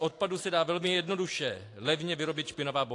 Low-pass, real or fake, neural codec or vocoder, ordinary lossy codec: 10.8 kHz; real; none; AAC, 48 kbps